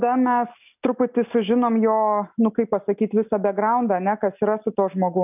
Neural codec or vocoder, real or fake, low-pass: none; real; 3.6 kHz